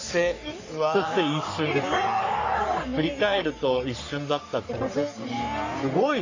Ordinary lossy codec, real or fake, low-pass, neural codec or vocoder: AAC, 48 kbps; fake; 7.2 kHz; codec, 44.1 kHz, 3.4 kbps, Pupu-Codec